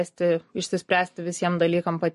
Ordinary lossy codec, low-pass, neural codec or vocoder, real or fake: MP3, 48 kbps; 14.4 kHz; none; real